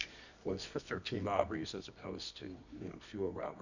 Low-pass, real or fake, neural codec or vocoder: 7.2 kHz; fake; codec, 24 kHz, 0.9 kbps, WavTokenizer, medium music audio release